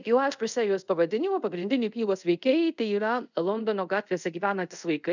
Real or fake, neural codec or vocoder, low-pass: fake; codec, 24 kHz, 0.5 kbps, DualCodec; 7.2 kHz